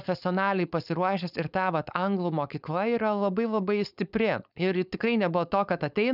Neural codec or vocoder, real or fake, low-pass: codec, 16 kHz, 4.8 kbps, FACodec; fake; 5.4 kHz